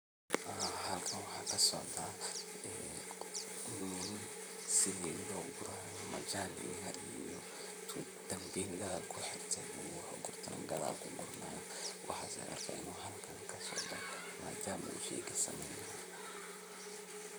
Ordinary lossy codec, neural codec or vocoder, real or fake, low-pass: none; vocoder, 44.1 kHz, 128 mel bands, Pupu-Vocoder; fake; none